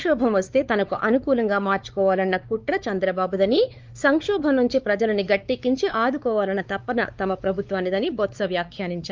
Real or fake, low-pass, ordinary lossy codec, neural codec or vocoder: fake; 7.2 kHz; Opus, 24 kbps; codec, 16 kHz, 4 kbps, X-Codec, WavLM features, trained on Multilingual LibriSpeech